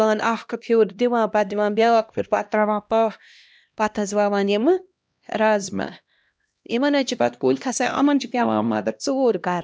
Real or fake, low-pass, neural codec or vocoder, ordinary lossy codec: fake; none; codec, 16 kHz, 1 kbps, X-Codec, HuBERT features, trained on LibriSpeech; none